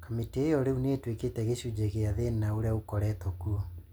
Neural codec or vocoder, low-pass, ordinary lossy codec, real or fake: none; none; none; real